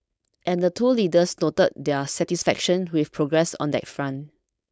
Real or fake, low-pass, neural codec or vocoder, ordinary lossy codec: fake; none; codec, 16 kHz, 4.8 kbps, FACodec; none